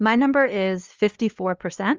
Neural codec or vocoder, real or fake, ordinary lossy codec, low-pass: codec, 16 kHz, 2 kbps, FunCodec, trained on LibriTTS, 25 frames a second; fake; Opus, 32 kbps; 7.2 kHz